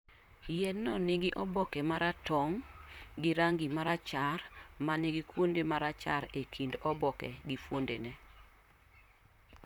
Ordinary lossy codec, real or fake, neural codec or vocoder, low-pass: none; fake; vocoder, 44.1 kHz, 128 mel bands, Pupu-Vocoder; 19.8 kHz